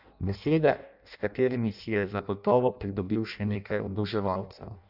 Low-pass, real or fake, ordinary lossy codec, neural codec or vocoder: 5.4 kHz; fake; none; codec, 16 kHz in and 24 kHz out, 0.6 kbps, FireRedTTS-2 codec